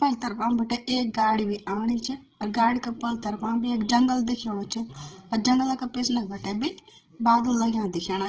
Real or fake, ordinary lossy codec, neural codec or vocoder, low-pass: fake; Opus, 16 kbps; codec, 16 kHz, 16 kbps, FreqCodec, larger model; 7.2 kHz